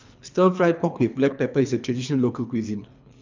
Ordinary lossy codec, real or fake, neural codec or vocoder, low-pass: MP3, 64 kbps; fake; codec, 24 kHz, 3 kbps, HILCodec; 7.2 kHz